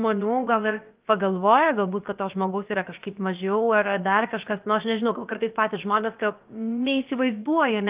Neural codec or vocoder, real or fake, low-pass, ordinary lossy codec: codec, 16 kHz, about 1 kbps, DyCAST, with the encoder's durations; fake; 3.6 kHz; Opus, 32 kbps